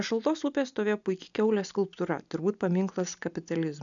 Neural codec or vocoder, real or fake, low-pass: none; real; 7.2 kHz